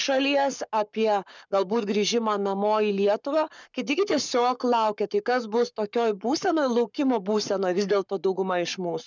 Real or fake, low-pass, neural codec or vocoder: fake; 7.2 kHz; codec, 44.1 kHz, 7.8 kbps, Pupu-Codec